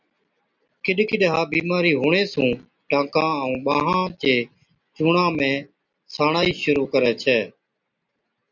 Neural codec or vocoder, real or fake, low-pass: none; real; 7.2 kHz